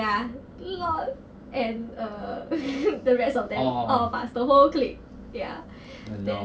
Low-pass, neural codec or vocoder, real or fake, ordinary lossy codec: none; none; real; none